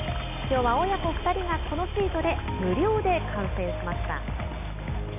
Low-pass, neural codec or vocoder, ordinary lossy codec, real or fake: 3.6 kHz; none; AAC, 24 kbps; real